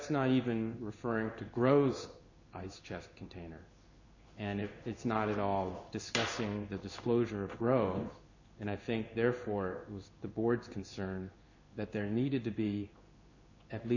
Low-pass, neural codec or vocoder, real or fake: 7.2 kHz; codec, 16 kHz in and 24 kHz out, 1 kbps, XY-Tokenizer; fake